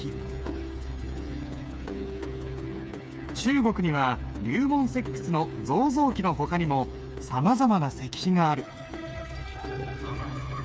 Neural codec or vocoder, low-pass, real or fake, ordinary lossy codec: codec, 16 kHz, 4 kbps, FreqCodec, smaller model; none; fake; none